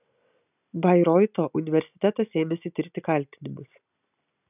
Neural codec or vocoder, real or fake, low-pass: none; real; 3.6 kHz